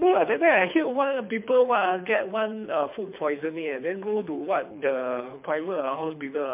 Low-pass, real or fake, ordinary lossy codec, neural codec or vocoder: 3.6 kHz; fake; MP3, 32 kbps; codec, 24 kHz, 3 kbps, HILCodec